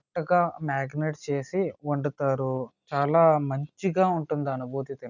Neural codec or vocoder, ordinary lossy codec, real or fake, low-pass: none; none; real; 7.2 kHz